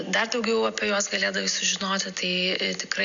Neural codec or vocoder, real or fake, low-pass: none; real; 7.2 kHz